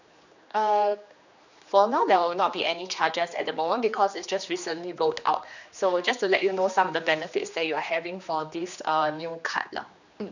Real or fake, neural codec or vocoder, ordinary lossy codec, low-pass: fake; codec, 16 kHz, 2 kbps, X-Codec, HuBERT features, trained on general audio; none; 7.2 kHz